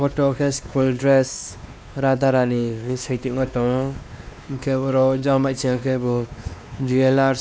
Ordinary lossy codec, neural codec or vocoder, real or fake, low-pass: none; codec, 16 kHz, 2 kbps, X-Codec, WavLM features, trained on Multilingual LibriSpeech; fake; none